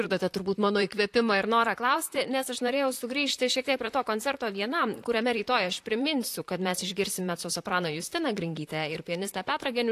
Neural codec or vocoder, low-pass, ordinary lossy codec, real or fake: vocoder, 44.1 kHz, 128 mel bands, Pupu-Vocoder; 14.4 kHz; AAC, 64 kbps; fake